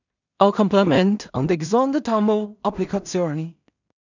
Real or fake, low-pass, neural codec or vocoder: fake; 7.2 kHz; codec, 16 kHz in and 24 kHz out, 0.4 kbps, LongCat-Audio-Codec, two codebook decoder